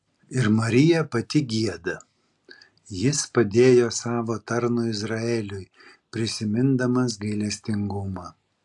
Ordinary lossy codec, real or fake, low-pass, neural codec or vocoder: AAC, 64 kbps; real; 10.8 kHz; none